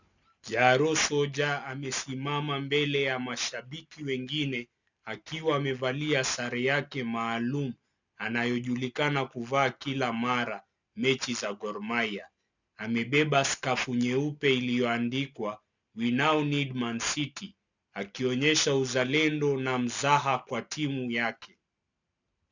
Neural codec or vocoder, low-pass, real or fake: none; 7.2 kHz; real